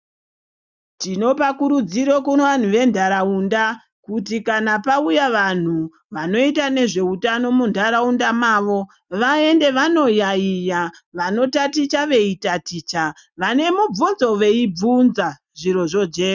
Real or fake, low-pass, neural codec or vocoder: real; 7.2 kHz; none